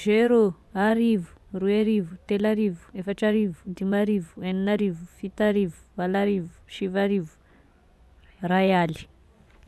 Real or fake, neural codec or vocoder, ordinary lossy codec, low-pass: fake; vocoder, 24 kHz, 100 mel bands, Vocos; none; none